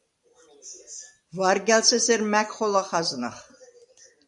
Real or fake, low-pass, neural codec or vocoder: real; 10.8 kHz; none